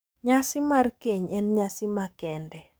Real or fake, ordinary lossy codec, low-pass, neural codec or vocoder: fake; none; none; codec, 44.1 kHz, 7.8 kbps, DAC